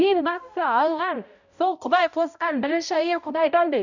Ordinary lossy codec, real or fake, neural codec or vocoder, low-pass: none; fake; codec, 16 kHz, 0.5 kbps, X-Codec, HuBERT features, trained on balanced general audio; 7.2 kHz